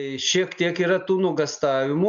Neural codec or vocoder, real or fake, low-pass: none; real; 7.2 kHz